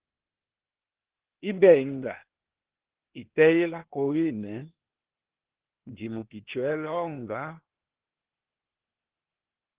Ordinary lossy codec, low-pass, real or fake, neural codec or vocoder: Opus, 16 kbps; 3.6 kHz; fake; codec, 16 kHz, 0.8 kbps, ZipCodec